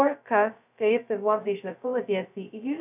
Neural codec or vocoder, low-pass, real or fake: codec, 16 kHz, 0.2 kbps, FocalCodec; 3.6 kHz; fake